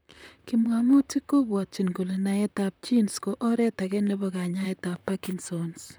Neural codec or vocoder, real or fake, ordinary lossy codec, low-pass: vocoder, 44.1 kHz, 128 mel bands, Pupu-Vocoder; fake; none; none